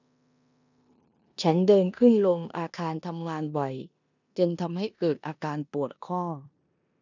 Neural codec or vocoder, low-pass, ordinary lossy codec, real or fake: codec, 16 kHz in and 24 kHz out, 0.9 kbps, LongCat-Audio-Codec, four codebook decoder; 7.2 kHz; none; fake